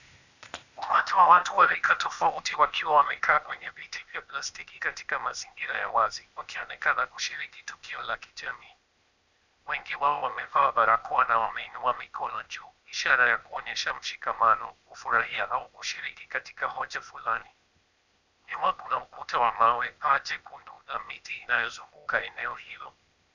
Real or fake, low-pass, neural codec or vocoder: fake; 7.2 kHz; codec, 16 kHz, 0.8 kbps, ZipCodec